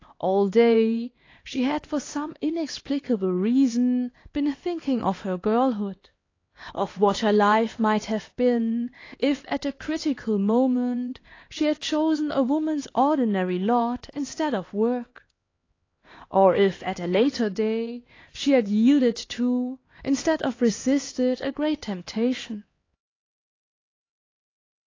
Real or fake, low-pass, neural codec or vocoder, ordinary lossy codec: fake; 7.2 kHz; codec, 16 kHz, 2 kbps, X-Codec, HuBERT features, trained on LibriSpeech; AAC, 32 kbps